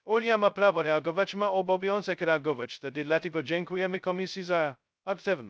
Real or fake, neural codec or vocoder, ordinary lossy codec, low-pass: fake; codec, 16 kHz, 0.2 kbps, FocalCodec; none; none